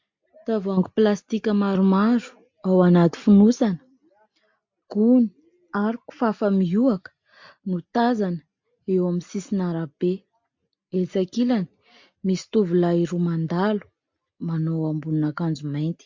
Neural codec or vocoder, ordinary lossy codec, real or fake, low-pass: none; MP3, 48 kbps; real; 7.2 kHz